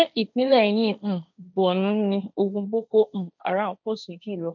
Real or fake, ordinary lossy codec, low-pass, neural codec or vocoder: fake; none; 7.2 kHz; codec, 16 kHz, 1.1 kbps, Voila-Tokenizer